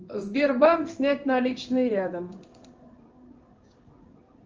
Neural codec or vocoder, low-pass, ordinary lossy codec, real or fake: codec, 16 kHz in and 24 kHz out, 1 kbps, XY-Tokenizer; 7.2 kHz; Opus, 24 kbps; fake